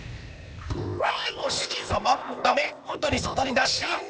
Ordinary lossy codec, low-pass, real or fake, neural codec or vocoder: none; none; fake; codec, 16 kHz, 0.8 kbps, ZipCodec